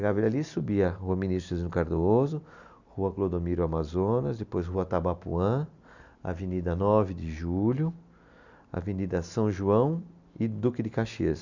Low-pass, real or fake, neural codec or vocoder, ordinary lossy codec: 7.2 kHz; real; none; none